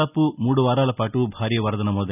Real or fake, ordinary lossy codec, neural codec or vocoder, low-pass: real; none; none; 3.6 kHz